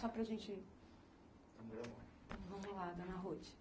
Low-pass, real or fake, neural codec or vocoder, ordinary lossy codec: none; real; none; none